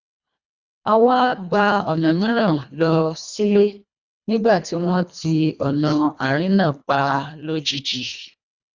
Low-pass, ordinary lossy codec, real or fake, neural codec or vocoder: 7.2 kHz; Opus, 64 kbps; fake; codec, 24 kHz, 1.5 kbps, HILCodec